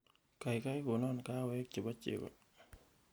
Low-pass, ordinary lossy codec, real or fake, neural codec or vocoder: none; none; real; none